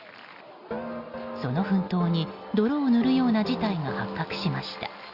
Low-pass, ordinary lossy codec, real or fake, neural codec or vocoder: 5.4 kHz; Opus, 64 kbps; real; none